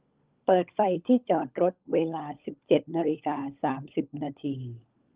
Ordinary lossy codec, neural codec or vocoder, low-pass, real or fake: Opus, 32 kbps; codec, 16 kHz, 16 kbps, FunCodec, trained on LibriTTS, 50 frames a second; 3.6 kHz; fake